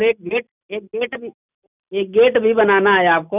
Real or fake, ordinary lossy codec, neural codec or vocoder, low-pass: real; none; none; 3.6 kHz